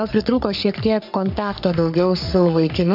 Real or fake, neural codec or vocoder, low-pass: fake; codec, 44.1 kHz, 3.4 kbps, Pupu-Codec; 5.4 kHz